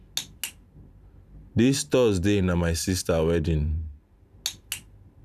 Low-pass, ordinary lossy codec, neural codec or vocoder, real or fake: 14.4 kHz; none; vocoder, 44.1 kHz, 128 mel bands every 512 samples, BigVGAN v2; fake